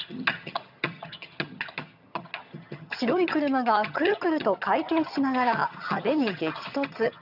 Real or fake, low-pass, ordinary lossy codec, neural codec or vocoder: fake; 5.4 kHz; none; vocoder, 22.05 kHz, 80 mel bands, HiFi-GAN